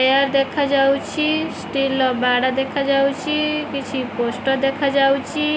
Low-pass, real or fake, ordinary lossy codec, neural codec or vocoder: none; real; none; none